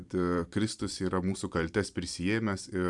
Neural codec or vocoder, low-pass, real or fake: vocoder, 44.1 kHz, 128 mel bands every 256 samples, BigVGAN v2; 10.8 kHz; fake